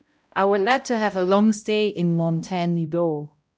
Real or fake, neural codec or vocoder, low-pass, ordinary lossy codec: fake; codec, 16 kHz, 0.5 kbps, X-Codec, HuBERT features, trained on balanced general audio; none; none